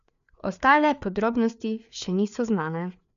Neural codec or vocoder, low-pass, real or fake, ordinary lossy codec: codec, 16 kHz, 4 kbps, FreqCodec, larger model; 7.2 kHz; fake; none